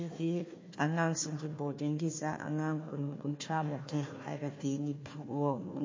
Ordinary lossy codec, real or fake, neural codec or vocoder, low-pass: MP3, 32 kbps; fake; codec, 16 kHz, 1 kbps, FunCodec, trained on Chinese and English, 50 frames a second; 7.2 kHz